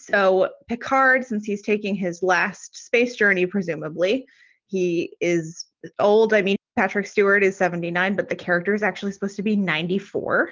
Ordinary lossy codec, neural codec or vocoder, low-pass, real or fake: Opus, 24 kbps; none; 7.2 kHz; real